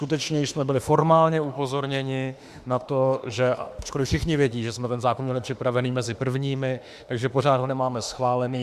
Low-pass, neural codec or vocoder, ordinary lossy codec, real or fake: 14.4 kHz; autoencoder, 48 kHz, 32 numbers a frame, DAC-VAE, trained on Japanese speech; Opus, 64 kbps; fake